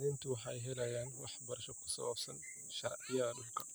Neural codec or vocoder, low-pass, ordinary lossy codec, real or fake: none; none; none; real